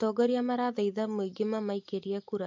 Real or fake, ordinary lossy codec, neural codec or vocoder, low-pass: real; MP3, 48 kbps; none; 7.2 kHz